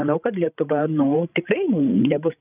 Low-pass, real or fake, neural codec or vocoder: 3.6 kHz; fake; codec, 16 kHz, 16 kbps, FreqCodec, larger model